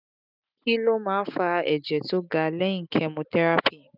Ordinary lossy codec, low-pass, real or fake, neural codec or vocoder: none; 5.4 kHz; real; none